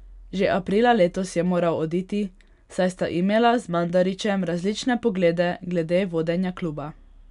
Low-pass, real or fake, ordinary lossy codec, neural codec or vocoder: 10.8 kHz; real; none; none